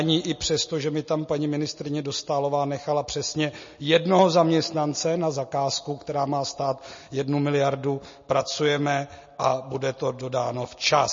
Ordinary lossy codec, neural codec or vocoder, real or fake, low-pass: MP3, 32 kbps; none; real; 7.2 kHz